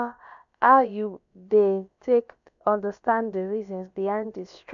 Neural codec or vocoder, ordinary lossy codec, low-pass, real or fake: codec, 16 kHz, about 1 kbps, DyCAST, with the encoder's durations; none; 7.2 kHz; fake